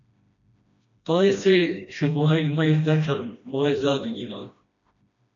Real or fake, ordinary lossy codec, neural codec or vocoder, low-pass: fake; MP3, 96 kbps; codec, 16 kHz, 1 kbps, FreqCodec, smaller model; 7.2 kHz